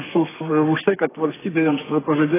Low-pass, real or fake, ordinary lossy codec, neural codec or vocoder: 3.6 kHz; fake; AAC, 16 kbps; codec, 32 kHz, 1.9 kbps, SNAC